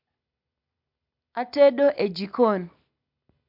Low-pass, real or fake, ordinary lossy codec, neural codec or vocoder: 5.4 kHz; real; MP3, 48 kbps; none